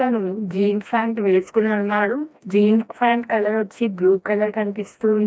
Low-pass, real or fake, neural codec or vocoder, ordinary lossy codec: none; fake; codec, 16 kHz, 1 kbps, FreqCodec, smaller model; none